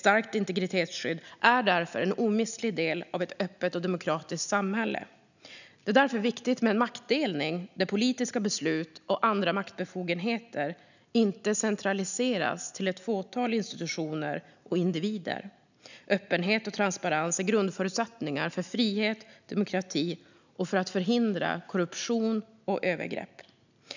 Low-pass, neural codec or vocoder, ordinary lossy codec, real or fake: 7.2 kHz; none; none; real